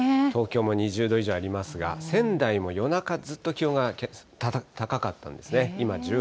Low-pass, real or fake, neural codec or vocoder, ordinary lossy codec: none; real; none; none